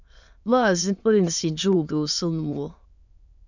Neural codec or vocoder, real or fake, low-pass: autoencoder, 22.05 kHz, a latent of 192 numbers a frame, VITS, trained on many speakers; fake; 7.2 kHz